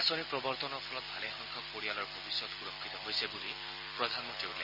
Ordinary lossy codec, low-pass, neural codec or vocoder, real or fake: none; 5.4 kHz; none; real